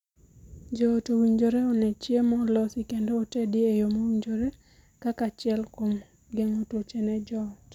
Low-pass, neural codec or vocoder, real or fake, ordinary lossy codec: 19.8 kHz; none; real; none